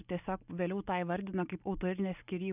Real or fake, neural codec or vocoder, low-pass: real; none; 3.6 kHz